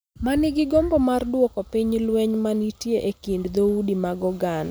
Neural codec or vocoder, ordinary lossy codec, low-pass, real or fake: none; none; none; real